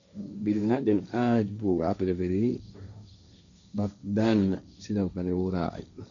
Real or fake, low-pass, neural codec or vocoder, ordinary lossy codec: fake; 7.2 kHz; codec, 16 kHz, 1.1 kbps, Voila-Tokenizer; none